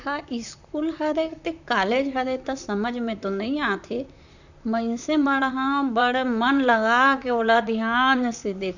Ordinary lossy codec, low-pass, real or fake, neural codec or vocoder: none; 7.2 kHz; fake; vocoder, 44.1 kHz, 128 mel bands, Pupu-Vocoder